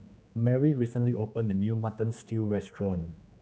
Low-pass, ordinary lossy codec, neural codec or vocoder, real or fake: none; none; codec, 16 kHz, 2 kbps, X-Codec, HuBERT features, trained on general audio; fake